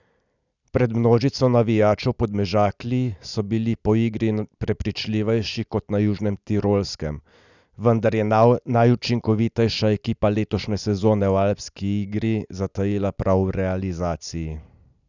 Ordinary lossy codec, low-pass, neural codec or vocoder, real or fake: none; 7.2 kHz; none; real